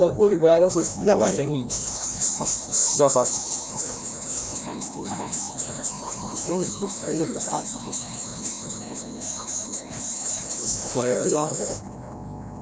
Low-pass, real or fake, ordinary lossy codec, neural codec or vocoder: none; fake; none; codec, 16 kHz, 1 kbps, FreqCodec, larger model